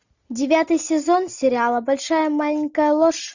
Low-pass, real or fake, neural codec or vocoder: 7.2 kHz; real; none